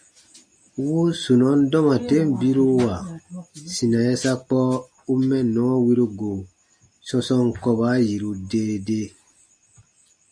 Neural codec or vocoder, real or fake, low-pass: none; real; 9.9 kHz